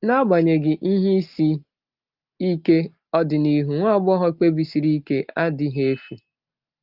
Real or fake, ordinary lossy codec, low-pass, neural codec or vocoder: real; Opus, 32 kbps; 5.4 kHz; none